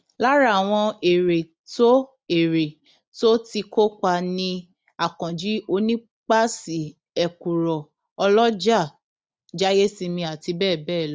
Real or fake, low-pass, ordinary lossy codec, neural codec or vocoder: real; none; none; none